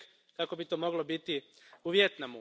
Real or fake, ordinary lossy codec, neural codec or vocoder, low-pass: real; none; none; none